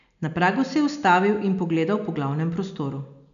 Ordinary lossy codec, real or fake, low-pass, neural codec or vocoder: none; real; 7.2 kHz; none